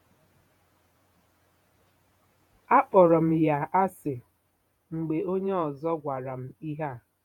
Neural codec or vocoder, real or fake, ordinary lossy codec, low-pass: vocoder, 48 kHz, 128 mel bands, Vocos; fake; MP3, 96 kbps; 19.8 kHz